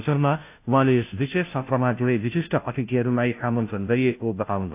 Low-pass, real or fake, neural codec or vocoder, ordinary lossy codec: 3.6 kHz; fake; codec, 16 kHz, 0.5 kbps, FunCodec, trained on Chinese and English, 25 frames a second; AAC, 32 kbps